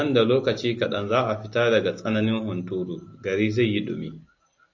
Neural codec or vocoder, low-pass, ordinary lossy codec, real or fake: none; 7.2 kHz; AAC, 48 kbps; real